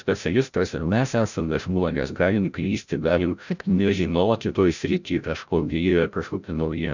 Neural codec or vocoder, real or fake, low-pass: codec, 16 kHz, 0.5 kbps, FreqCodec, larger model; fake; 7.2 kHz